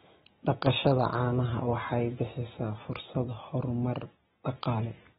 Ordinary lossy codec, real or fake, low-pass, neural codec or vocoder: AAC, 16 kbps; real; 19.8 kHz; none